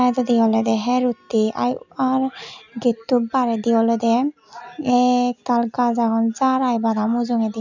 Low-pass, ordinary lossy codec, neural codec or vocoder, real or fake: 7.2 kHz; none; none; real